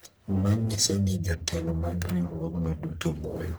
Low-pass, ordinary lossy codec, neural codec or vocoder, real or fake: none; none; codec, 44.1 kHz, 1.7 kbps, Pupu-Codec; fake